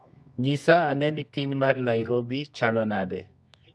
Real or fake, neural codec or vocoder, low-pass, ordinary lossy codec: fake; codec, 24 kHz, 0.9 kbps, WavTokenizer, medium music audio release; none; none